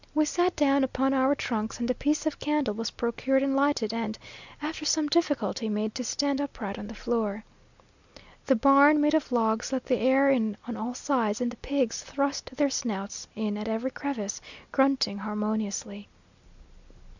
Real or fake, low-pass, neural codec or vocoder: real; 7.2 kHz; none